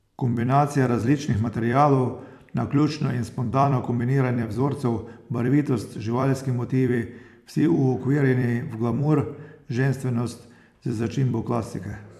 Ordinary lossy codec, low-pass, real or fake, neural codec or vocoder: none; 14.4 kHz; fake; vocoder, 44.1 kHz, 128 mel bands every 256 samples, BigVGAN v2